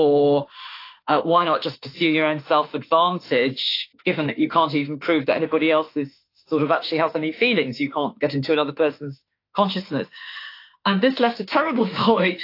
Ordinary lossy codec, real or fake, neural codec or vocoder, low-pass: AAC, 32 kbps; fake; autoencoder, 48 kHz, 32 numbers a frame, DAC-VAE, trained on Japanese speech; 5.4 kHz